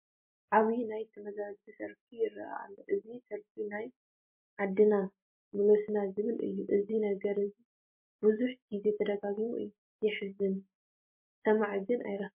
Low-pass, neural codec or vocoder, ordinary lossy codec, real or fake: 3.6 kHz; none; MP3, 32 kbps; real